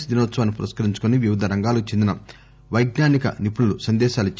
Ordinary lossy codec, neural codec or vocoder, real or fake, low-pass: none; none; real; none